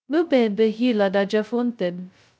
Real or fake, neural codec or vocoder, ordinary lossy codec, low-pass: fake; codec, 16 kHz, 0.2 kbps, FocalCodec; none; none